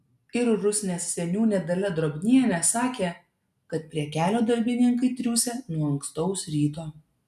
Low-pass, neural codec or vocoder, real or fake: 14.4 kHz; none; real